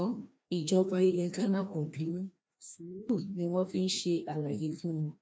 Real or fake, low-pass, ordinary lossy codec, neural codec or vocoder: fake; none; none; codec, 16 kHz, 1 kbps, FreqCodec, larger model